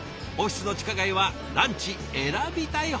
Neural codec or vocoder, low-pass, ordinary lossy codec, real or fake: none; none; none; real